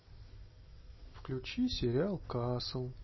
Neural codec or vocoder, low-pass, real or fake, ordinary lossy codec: none; 7.2 kHz; real; MP3, 24 kbps